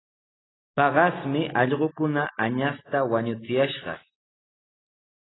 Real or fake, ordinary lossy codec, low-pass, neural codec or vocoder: real; AAC, 16 kbps; 7.2 kHz; none